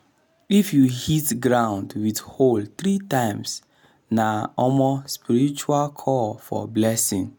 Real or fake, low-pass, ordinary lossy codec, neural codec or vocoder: real; none; none; none